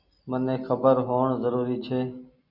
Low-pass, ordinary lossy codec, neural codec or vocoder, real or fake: 5.4 kHz; Opus, 64 kbps; none; real